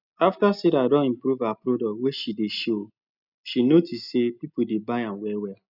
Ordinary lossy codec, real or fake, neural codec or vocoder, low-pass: none; real; none; 5.4 kHz